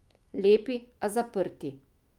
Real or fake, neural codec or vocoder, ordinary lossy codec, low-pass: fake; autoencoder, 48 kHz, 128 numbers a frame, DAC-VAE, trained on Japanese speech; Opus, 32 kbps; 19.8 kHz